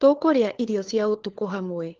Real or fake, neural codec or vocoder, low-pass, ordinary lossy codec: fake; codec, 16 kHz, 4 kbps, FunCodec, trained on LibriTTS, 50 frames a second; 7.2 kHz; Opus, 16 kbps